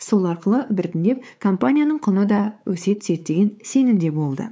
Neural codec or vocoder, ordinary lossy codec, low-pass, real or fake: codec, 16 kHz, 4 kbps, X-Codec, WavLM features, trained on Multilingual LibriSpeech; none; none; fake